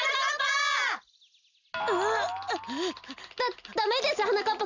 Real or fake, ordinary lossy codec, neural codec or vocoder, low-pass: real; none; none; 7.2 kHz